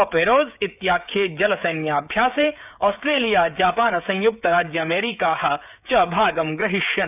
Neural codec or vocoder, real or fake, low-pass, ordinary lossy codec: codec, 16 kHz, 16 kbps, FreqCodec, smaller model; fake; 3.6 kHz; none